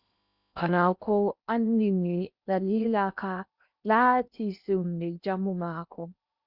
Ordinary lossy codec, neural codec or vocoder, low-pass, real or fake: Opus, 64 kbps; codec, 16 kHz in and 24 kHz out, 0.8 kbps, FocalCodec, streaming, 65536 codes; 5.4 kHz; fake